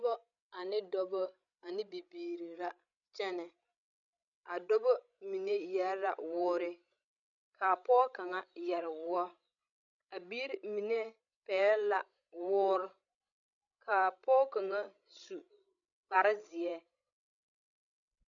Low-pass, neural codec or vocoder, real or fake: 7.2 kHz; codec, 16 kHz, 16 kbps, FreqCodec, larger model; fake